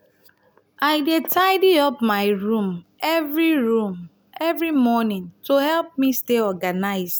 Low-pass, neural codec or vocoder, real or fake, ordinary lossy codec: none; none; real; none